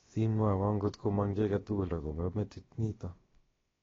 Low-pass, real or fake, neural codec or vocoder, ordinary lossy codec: 7.2 kHz; fake; codec, 16 kHz, about 1 kbps, DyCAST, with the encoder's durations; AAC, 24 kbps